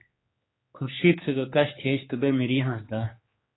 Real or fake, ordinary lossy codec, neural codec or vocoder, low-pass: fake; AAC, 16 kbps; codec, 16 kHz, 4 kbps, X-Codec, HuBERT features, trained on general audio; 7.2 kHz